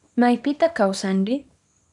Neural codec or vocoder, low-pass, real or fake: codec, 24 kHz, 0.9 kbps, WavTokenizer, small release; 10.8 kHz; fake